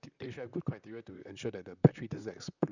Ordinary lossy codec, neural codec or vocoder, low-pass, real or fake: none; vocoder, 44.1 kHz, 128 mel bands, Pupu-Vocoder; 7.2 kHz; fake